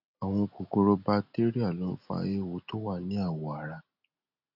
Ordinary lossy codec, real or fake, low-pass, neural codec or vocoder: none; real; 5.4 kHz; none